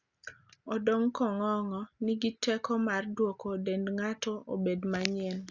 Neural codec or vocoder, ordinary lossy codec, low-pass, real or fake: none; Opus, 64 kbps; 7.2 kHz; real